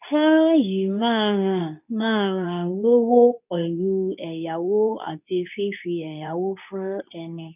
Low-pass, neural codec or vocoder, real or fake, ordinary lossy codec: 3.6 kHz; codec, 24 kHz, 0.9 kbps, WavTokenizer, medium speech release version 2; fake; none